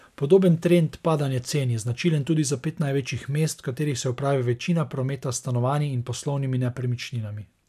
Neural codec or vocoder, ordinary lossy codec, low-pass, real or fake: none; none; 14.4 kHz; real